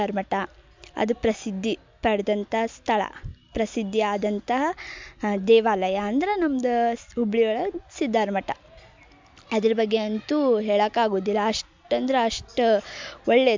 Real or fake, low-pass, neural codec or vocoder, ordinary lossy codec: real; 7.2 kHz; none; MP3, 64 kbps